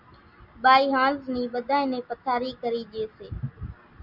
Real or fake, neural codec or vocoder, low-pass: real; none; 5.4 kHz